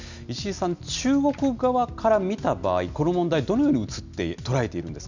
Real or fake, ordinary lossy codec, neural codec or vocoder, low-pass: real; none; none; 7.2 kHz